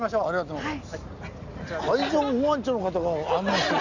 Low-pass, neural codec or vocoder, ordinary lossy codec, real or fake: 7.2 kHz; none; none; real